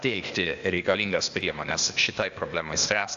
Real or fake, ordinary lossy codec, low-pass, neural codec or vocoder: fake; AAC, 64 kbps; 7.2 kHz; codec, 16 kHz, 0.8 kbps, ZipCodec